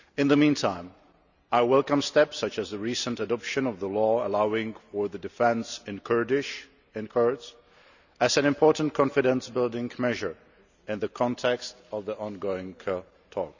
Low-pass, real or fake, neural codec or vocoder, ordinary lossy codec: 7.2 kHz; real; none; none